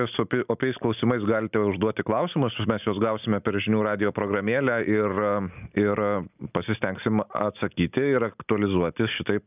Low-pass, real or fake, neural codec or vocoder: 3.6 kHz; real; none